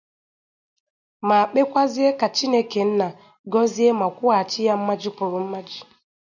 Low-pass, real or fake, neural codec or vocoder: 7.2 kHz; real; none